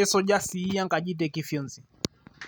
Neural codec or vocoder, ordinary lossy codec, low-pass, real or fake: none; none; none; real